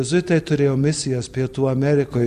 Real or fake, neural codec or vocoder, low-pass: real; none; 14.4 kHz